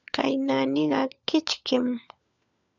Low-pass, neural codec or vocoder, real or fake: 7.2 kHz; codec, 16 kHz, 4 kbps, FunCodec, trained on LibriTTS, 50 frames a second; fake